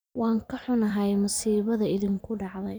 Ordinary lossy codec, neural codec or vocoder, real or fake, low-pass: none; vocoder, 44.1 kHz, 128 mel bands every 512 samples, BigVGAN v2; fake; none